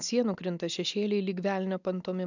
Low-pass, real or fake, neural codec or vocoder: 7.2 kHz; real; none